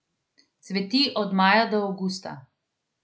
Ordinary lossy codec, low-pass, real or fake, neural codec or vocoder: none; none; real; none